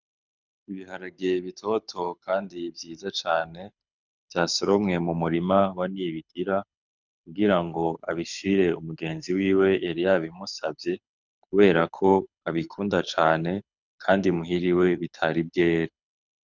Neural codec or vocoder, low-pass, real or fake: codec, 24 kHz, 6 kbps, HILCodec; 7.2 kHz; fake